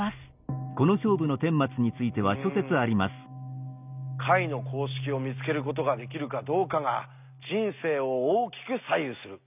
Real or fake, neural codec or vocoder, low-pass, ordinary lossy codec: real; none; 3.6 kHz; none